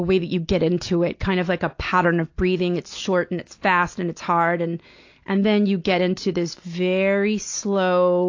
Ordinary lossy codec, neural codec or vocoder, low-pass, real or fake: AAC, 48 kbps; none; 7.2 kHz; real